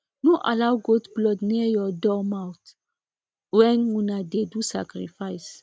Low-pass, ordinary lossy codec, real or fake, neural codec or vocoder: none; none; real; none